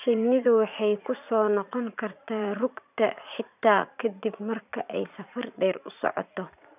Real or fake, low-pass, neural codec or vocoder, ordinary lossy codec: fake; 3.6 kHz; vocoder, 44.1 kHz, 80 mel bands, Vocos; none